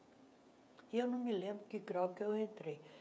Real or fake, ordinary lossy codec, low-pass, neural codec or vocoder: fake; none; none; codec, 16 kHz, 16 kbps, FreqCodec, smaller model